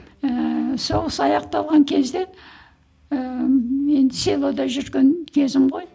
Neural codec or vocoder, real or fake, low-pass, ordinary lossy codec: none; real; none; none